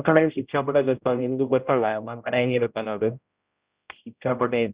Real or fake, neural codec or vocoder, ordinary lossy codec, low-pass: fake; codec, 16 kHz, 0.5 kbps, X-Codec, HuBERT features, trained on general audio; Opus, 64 kbps; 3.6 kHz